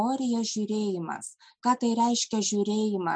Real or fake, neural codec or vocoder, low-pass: real; none; 9.9 kHz